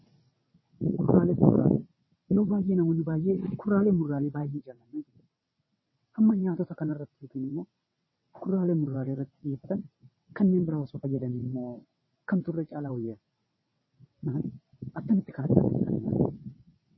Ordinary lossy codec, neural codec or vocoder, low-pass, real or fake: MP3, 24 kbps; vocoder, 22.05 kHz, 80 mel bands, WaveNeXt; 7.2 kHz; fake